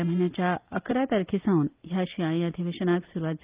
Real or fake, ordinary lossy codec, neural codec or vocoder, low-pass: real; Opus, 24 kbps; none; 3.6 kHz